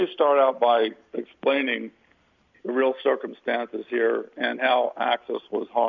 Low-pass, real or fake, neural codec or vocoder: 7.2 kHz; real; none